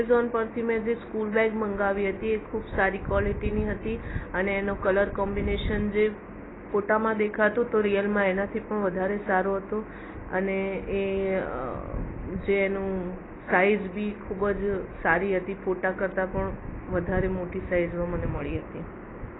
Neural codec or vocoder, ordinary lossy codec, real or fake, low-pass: none; AAC, 16 kbps; real; 7.2 kHz